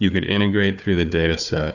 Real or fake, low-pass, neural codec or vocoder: fake; 7.2 kHz; codec, 16 kHz, 4 kbps, FunCodec, trained on Chinese and English, 50 frames a second